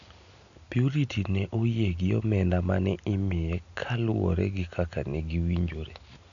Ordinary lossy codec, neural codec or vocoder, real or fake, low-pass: none; none; real; 7.2 kHz